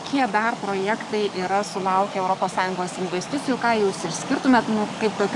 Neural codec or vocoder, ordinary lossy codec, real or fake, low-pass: codec, 44.1 kHz, 7.8 kbps, DAC; MP3, 96 kbps; fake; 10.8 kHz